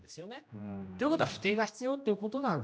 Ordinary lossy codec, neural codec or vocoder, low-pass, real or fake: none; codec, 16 kHz, 1 kbps, X-Codec, HuBERT features, trained on general audio; none; fake